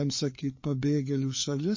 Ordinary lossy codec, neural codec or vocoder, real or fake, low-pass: MP3, 32 kbps; codec, 16 kHz, 4 kbps, FreqCodec, larger model; fake; 7.2 kHz